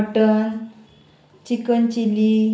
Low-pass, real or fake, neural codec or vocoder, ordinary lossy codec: none; real; none; none